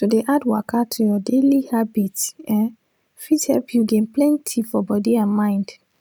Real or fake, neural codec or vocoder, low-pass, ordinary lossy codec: real; none; none; none